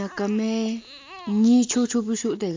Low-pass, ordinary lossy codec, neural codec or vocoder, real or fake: 7.2 kHz; MP3, 48 kbps; none; real